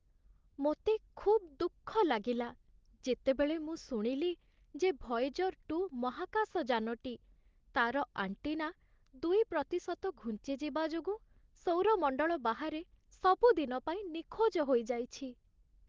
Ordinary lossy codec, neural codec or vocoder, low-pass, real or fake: Opus, 16 kbps; none; 7.2 kHz; real